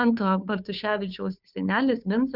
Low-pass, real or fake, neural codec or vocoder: 5.4 kHz; fake; codec, 16 kHz, 2 kbps, FunCodec, trained on Chinese and English, 25 frames a second